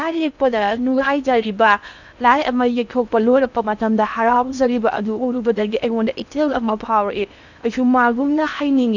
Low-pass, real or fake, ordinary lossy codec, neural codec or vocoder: 7.2 kHz; fake; none; codec, 16 kHz in and 24 kHz out, 0.6 kbps, FocalCodec, streaming, 4096 codes